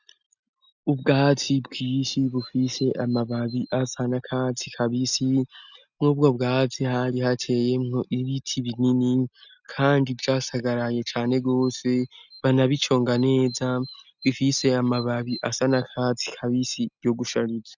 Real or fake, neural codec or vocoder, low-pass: real; none; 7.2 kHz